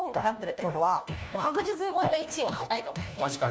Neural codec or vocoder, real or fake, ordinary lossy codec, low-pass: codec, 16 kHz, 1 kbps, FunCodec, trained on LibriTTS, 50 frames a second; fake; none; none